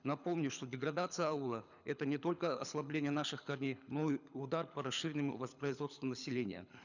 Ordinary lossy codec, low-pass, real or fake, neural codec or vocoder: none; 7.2 kHz; fake; codec, 24 kHz, 6 kbps, HILCodec